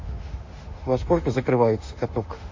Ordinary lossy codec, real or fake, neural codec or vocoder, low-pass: MP3, 48 kbps; fake; autoencoder, 48 kHz, 32 numbers a frame, DAC-VAE, trained on Japanese speech; 7.2 kHz